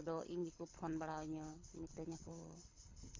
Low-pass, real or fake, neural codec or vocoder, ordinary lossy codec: 7.2 kHz; fake; codec, 24 kHz, 6 kbps, HILCodec; MP3, 48 kbps